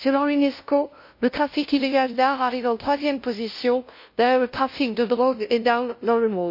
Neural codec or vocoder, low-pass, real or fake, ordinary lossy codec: codec, 16 kHz, 0.5 kbps, FunCodec, trained on LibriTTS, 25 frames a second; 5.4 kHz; fake; MP3, 32 kbps